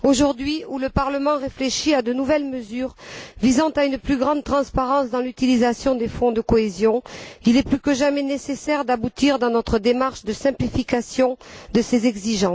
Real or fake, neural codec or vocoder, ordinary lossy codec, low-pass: real; none; none; none